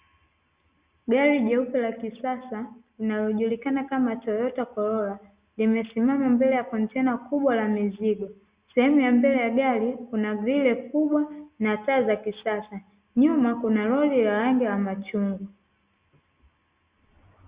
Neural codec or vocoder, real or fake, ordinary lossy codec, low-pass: none; real; Opus, 64 kbps; 3.6 kHz